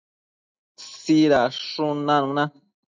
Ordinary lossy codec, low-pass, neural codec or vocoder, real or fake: MP3, 64 kbps; 7.2 kHz; none; real